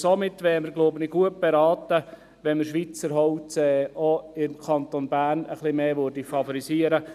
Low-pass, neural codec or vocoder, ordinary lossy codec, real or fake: 14.4 kHz; none; none; real